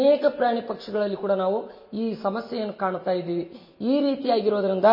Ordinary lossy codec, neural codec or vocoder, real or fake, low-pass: MP3, 24 kbps; none; real; 5.4 kHz